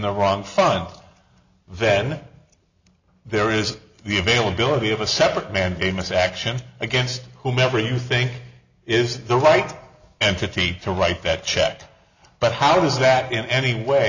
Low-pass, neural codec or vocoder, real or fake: 7.2 kHz; none; real